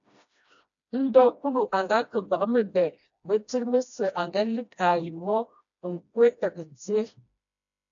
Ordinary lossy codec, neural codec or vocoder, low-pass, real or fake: AAC, 64 kbps; codec, 16 kHz, 1 kbps, FreqCodec, smaller model; 7.2 kHz; fake